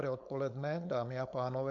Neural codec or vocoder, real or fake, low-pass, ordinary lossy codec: codec, 16 kHz, 4.8 kbps, FACodec; fake; 7.2 kHz; MP3, 64 kbps